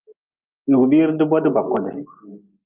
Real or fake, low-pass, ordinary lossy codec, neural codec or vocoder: real; 3.6 kHz; Opus, 24 kbps; none